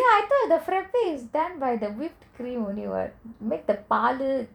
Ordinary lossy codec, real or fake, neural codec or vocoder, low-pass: none; fake; vocoder, 44.1 kHz, 128 mel bands every 256 samples, BigVGAN v2; 19.8 kHz